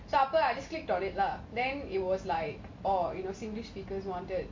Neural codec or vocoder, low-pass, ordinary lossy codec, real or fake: none; 7.2 kHz; MP3, 48 kbps; real